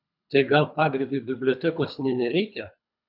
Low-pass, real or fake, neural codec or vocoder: 5.4 kHz; fake; codec, 24 kHz, 3 kbps, HILCodec